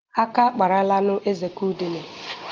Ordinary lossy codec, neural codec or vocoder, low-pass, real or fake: Opus, 32 kbps; none; 7.2 kHz; real